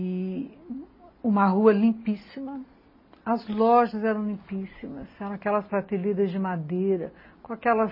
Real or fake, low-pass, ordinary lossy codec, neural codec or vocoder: real; 5.4 kHz; MP3, 24 kbps; none